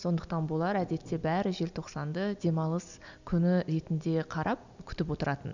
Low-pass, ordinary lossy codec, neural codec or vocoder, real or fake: 7.2 kHz; none; none; real